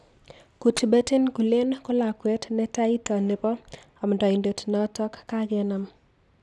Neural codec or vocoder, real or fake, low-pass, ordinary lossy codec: none; real; none; none